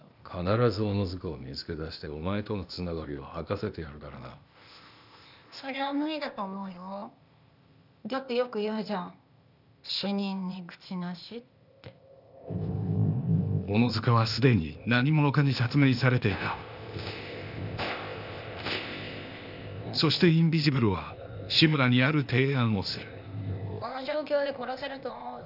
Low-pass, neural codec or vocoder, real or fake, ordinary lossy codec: 5.4 kHz; codec, 16 kHz, 0.8 kbps, ZipCodec; fake; none